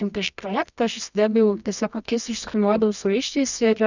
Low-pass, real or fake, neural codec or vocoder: 7.2 kHz; fake; codec, 24 kHz, 0.9 kbps, WavTokenizer, medium music audio release